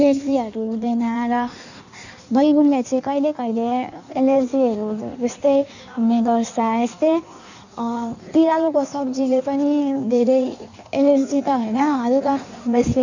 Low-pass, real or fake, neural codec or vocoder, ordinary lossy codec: 7.2 kHz; fake; codec, 16 kHz in and 24 kHz out, 1.1 kbps, FireRedTTS-2 codec; none